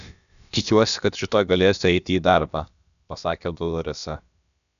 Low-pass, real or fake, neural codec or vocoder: 7.2 kHz; fake; codec, 16 kHz, about 1 kbps, DyCAST, with the encoder's durations